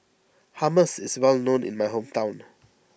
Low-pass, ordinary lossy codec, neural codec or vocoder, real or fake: none; none; none; real